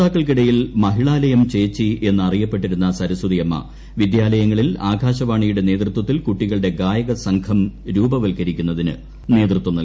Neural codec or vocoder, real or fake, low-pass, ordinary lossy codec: none; real; none; none